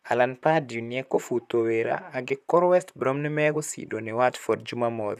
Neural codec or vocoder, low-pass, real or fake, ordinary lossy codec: vocoder, 44.1 kHz, 128 mel bands, Pupu-Vocoder; 14.4 kHz; fake; none